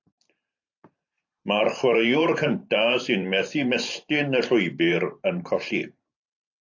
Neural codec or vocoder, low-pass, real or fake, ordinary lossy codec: vocoder, 44.1 kHz, 128 mel bands every 512 samples, BigVGAN v2; 7.2 kHz; fake; AAC, 48 kbps